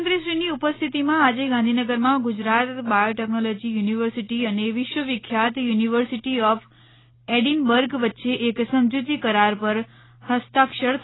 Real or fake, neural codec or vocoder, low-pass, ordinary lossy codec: real; none; 7.2 kHz; AAC, 16 kbps